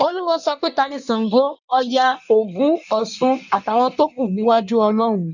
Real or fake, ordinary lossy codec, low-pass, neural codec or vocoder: fake; none; 7.2 kHz; codec, 16 kHz in and 24 kHz out, 1.1 kbps, FireRedTTS-2 codec